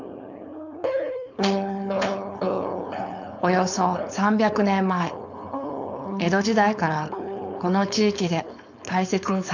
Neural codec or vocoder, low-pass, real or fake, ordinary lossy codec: codec, 16 kHz, 4.8 kbps, FACodec; 7.2 kHz; fake; none